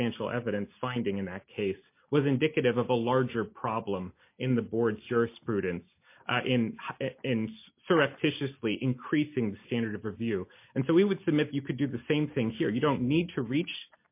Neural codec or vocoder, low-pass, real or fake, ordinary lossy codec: none; 3.6 kHz; real; MP3, 24 kbps